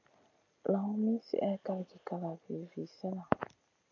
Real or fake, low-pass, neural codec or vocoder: real; 7.2 kHz; none